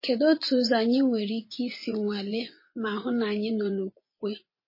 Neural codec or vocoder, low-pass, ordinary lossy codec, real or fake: vocoder, 24 kHz, 100 mel bands, Vocos; 5.4 kHz; MP3, 24 kbps; fake